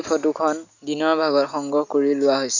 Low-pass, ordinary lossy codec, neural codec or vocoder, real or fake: 7.2 kHz; none; none; real